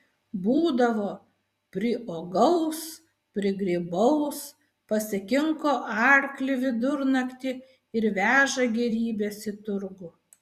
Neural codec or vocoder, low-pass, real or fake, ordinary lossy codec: vocoder, 44.1 kHz, 128 mel bands every 256 samples, BigVGAN v2; 14.4 kHz; fake; Opus, 64 kbps